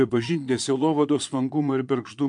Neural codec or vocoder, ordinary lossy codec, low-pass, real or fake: vocoder, 22.05 kHz, 80 mel bands, Vocos; MP3, 64 kbps; 9.9 kHz; fake